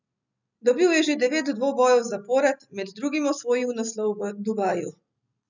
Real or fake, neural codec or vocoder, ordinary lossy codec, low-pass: real; none; none; 7.2 kHz